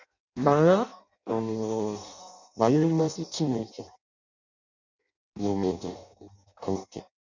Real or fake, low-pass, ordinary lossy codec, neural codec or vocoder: fake; 7.2 kHz; none; codec, 16 kHz in and 24 kHz out, 0.6 kbps, FireRedTTS-2 codec